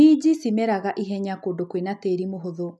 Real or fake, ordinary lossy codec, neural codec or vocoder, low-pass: real; none; none; none